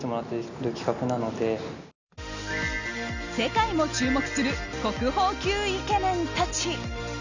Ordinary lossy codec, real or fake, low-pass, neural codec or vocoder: none; real; 7.2 kHz; none